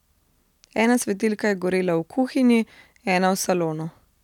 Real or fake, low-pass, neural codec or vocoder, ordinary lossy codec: real; 19.8 kHz; none; none